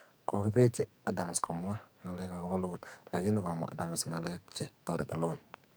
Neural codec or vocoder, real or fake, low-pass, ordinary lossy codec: codec, 44.1 kHz, 2.6 kbps, SNAC; fake; none; none